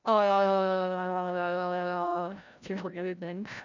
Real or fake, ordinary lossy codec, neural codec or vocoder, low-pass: fake; none; codec, 16 kHz, 0.5 kbps, FreqCodec, larger model; 7.2 kHz